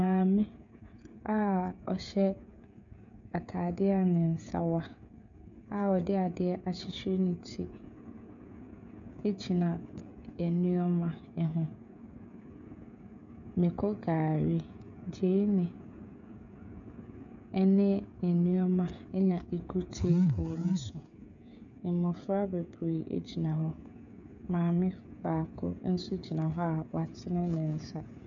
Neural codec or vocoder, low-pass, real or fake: codec, 16 kHz, 16 kbps, FreqCodec, smaller model; 7.2 kHz; fake